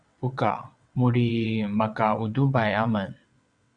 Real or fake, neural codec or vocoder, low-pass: fake; vocoder, 22.05 kHz, 80 mel bands, WaveNeXt; 9.9 kHz